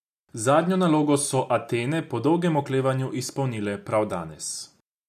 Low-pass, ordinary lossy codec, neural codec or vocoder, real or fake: 14.4 kHz; none; none; real